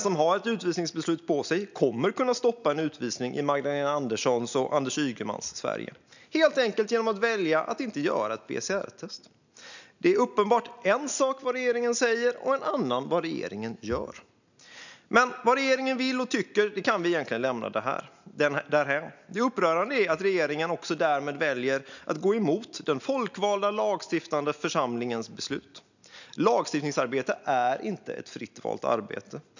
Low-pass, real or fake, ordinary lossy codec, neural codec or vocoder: 7.2 kHz; real; none; none